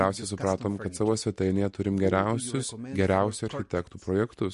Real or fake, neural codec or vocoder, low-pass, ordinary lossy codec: real; none; 14.4 kHz; MP3, 48 kbps